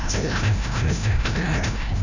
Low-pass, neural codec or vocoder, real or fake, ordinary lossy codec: 7.2 kHz; codec, 16 kHz, 0.5 kbps, FreqCodec, larger model; fake; none